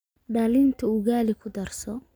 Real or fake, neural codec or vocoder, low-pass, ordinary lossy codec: real; none; none; none